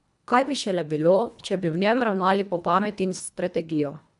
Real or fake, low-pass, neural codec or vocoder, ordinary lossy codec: fake; 10.8 kHz; codec, 24 kHz, 1.5 kbps, HILCodec; none